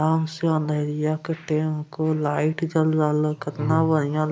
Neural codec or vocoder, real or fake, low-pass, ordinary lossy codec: none; real; none; none